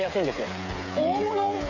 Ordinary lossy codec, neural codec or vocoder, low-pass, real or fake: none; codec, 16 kHz, 8 kbps, FreqCodec, smaller model; 7.2 kHz; fake